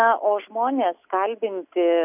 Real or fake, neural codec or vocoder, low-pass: real; none; 3.6 kHz